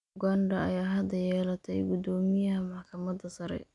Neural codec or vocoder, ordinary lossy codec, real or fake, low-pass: none; none; real; 10.8 kHz